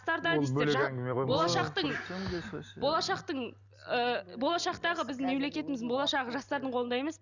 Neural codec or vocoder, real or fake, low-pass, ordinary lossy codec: none; real; 7.2 kHz; none